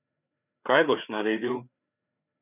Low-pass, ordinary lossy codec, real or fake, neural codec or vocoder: 3.6 kHz; none; fake; codec, 16 kHz, 4 kbps, FreqCodec, larger model